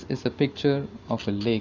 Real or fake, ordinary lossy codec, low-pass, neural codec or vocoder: real; none; 7.2 kHz; none